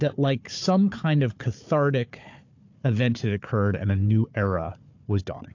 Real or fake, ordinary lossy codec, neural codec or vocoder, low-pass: fake; AAC, 48 kbps; codec, 16 kHz, 4 kbps, FunCodec, trained on Chinese and English, 50 frames a second; 7.2 kHz